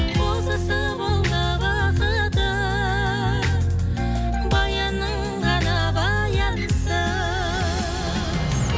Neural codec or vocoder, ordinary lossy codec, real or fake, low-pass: none; none; real; none